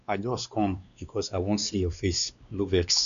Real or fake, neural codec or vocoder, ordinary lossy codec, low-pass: fake; codec, 16 kHz, 2 kbps, X-Codec, WavLM features, trained on Multilingual LibriSpeech; none; 7.2 kHz